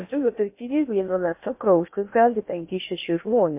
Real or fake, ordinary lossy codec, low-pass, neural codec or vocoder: fake; MP3, 24 kbps; 3.6 kHz; codec, 16 kHz in and 24 kHz out, 0.6 kbps, FocalCodec, streaming, 2048 codes